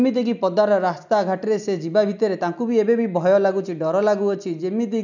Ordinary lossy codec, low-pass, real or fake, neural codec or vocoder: none; 7.2 kHz; real; none